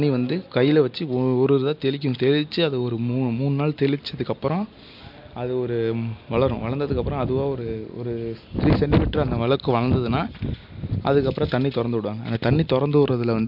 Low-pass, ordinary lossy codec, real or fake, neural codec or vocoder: 5.4 kHz; MP3, 48 kbps; real; none